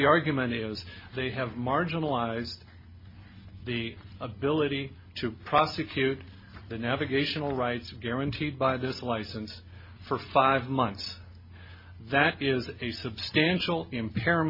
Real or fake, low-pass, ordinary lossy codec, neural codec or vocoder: real; 5.4 kHz; MP3, 24 kbps; none